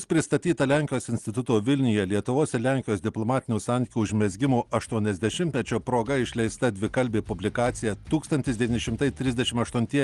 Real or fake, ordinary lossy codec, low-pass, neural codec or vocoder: real; Opus, 24 kbps; 10.8 kHz; none